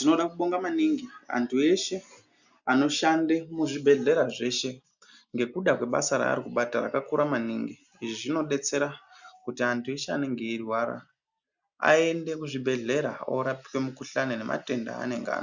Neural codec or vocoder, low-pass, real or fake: none; 7.2 kHz; real